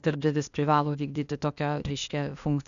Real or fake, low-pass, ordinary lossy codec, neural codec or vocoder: fake; 7.2 kHz; MP3, 96 kbps; codec, 16 kHz, 0.8 kbps, ZipCodec